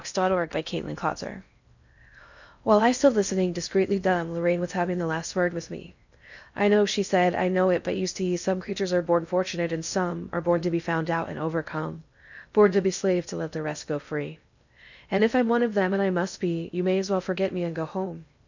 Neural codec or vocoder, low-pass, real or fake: codec, 16 kHz in and 24 kHz out, 0.6 kbps, FocalCodec, streaming, 4096 codes; 7.2 kHz; fake